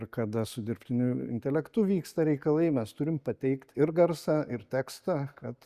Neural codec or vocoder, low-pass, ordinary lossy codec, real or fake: none; 14.4 kHz; Opus, 64 kbps; real